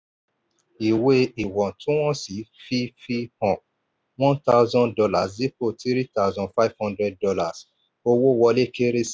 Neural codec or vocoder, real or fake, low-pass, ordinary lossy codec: none; real; none; none